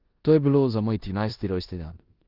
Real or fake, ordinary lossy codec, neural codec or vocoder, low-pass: fake; Opus, 32 kbps; codec, 16 kHz in and 24 kHz out, 0.9 kbps, LongCat-Audio-Codec, four codebook decoder; 5.4 kHz